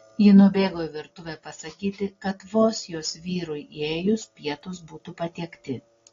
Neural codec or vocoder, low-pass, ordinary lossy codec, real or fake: none; 7.2 kHz; AAC, 32 kbps; real